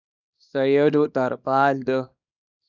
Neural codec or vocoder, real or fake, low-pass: codec, 24 kHz, 0.9 kbps, WavTokenizer, small release; fake; 7.2 kHz